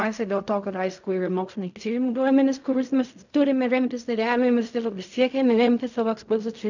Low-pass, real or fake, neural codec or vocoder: 7.2 kHz; fake; codec, 16 kHz in and 24 kHz out, 0.4 kbps, LongCat-Audio-Codec, fine tuned four codebook decoder